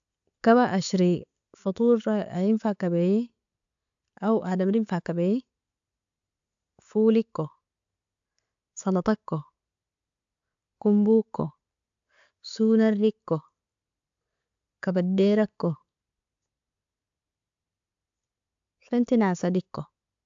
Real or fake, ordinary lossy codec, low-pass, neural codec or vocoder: real; AAC, 64 kbps; 7.2 kHz; none